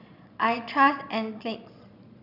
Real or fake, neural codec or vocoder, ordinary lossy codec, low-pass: fake; vocoder, 22.05 kHz, 80 mel bands, Vocos; none; 5.4 kHz